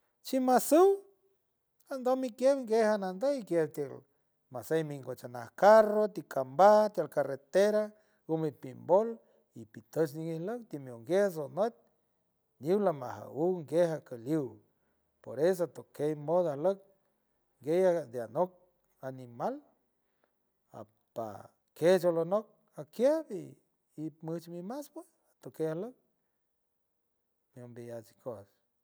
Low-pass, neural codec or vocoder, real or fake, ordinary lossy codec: none; none; real; none